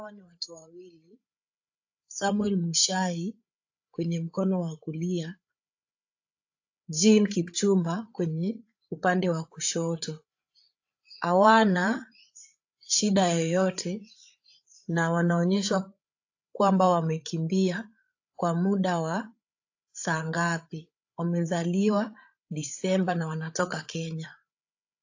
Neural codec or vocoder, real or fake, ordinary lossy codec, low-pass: codec, 16 kHz, 8 kbps, FreqCodec, larger model; fake; AAC, 48 kbps; 7.2 kHz